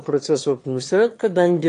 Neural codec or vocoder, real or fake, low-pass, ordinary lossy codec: autoencoder, 22.05 kHz, a latent of 192 numbers a frame, VITS, trained on one speaker; fake; 9.9 kHz; AAC, 48 kbps